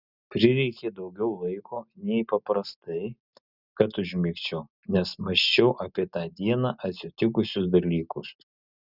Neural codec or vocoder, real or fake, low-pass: none; real; 5.4 kHz